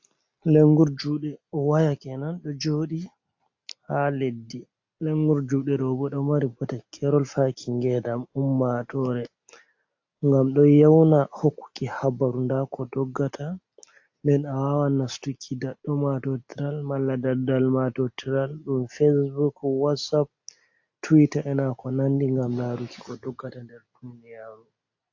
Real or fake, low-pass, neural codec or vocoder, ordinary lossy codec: real; 7.2 kHz; none; AAC, 48 kbps